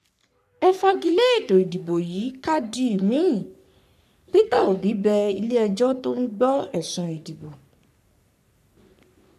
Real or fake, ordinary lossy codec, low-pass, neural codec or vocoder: fake; none; 14.4 kHz; codec, 44.1 kHz, 3.4 kbps, Pupu-Codec